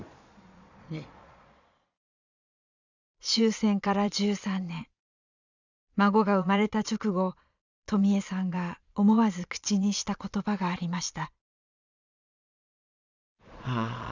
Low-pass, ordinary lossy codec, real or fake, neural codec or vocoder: 7.2 kHz; none; fake; vocoder, 22.05 kHz, 80 mel bands, Vocos